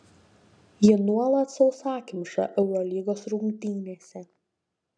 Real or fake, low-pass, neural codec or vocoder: real; 9.9 kHz; none